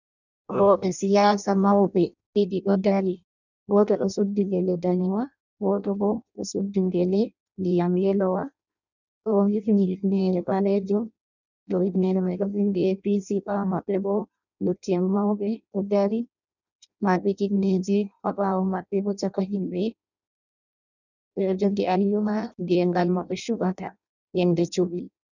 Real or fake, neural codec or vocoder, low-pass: fake; codec, 16 kHz in and 24 kHz out, 0.6 kbps, FireRedTTS-2 codec; 7.2 kHz